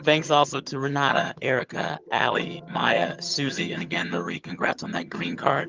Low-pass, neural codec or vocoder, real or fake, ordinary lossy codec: 7.2 kHz; vocoder, 22.05 kHz, 80 mel bands, HiFi-GAN; fake; Opus, 24 kbps